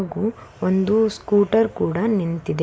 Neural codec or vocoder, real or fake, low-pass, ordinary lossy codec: none; real; none; none